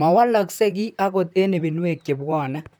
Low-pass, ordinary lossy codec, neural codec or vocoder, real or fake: none; none; vocoder, 44.1 kHz, 128 mel bands, Pupu-Vocoder; fake